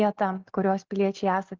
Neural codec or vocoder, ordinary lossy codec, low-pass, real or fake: none; Opus, 24 kbps; 7.2 kHz; real